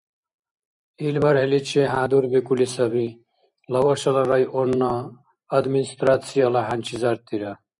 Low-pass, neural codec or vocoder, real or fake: 10.8 kHz; vocoder, 44.1 kHz, 128 mel bands every 512 samples, BigVGAN v2; fake